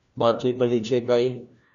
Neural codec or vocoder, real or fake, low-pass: codec, 16 kHz, 1 kbps, FunCodec, trained on LibriTTS, 50 frames a second; fake; 7.2 kHz